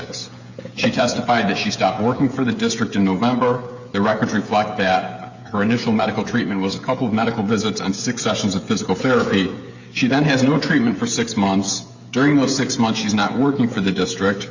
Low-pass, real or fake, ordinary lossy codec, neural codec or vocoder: 7.2 kHz; fake; Opus, 64 kbps; codec, 16 kHz, 16 kbps, FreqCodec, smaller model